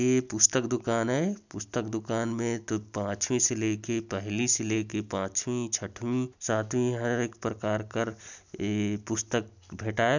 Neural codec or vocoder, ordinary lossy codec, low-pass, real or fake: none; none; 7.2 kHz; real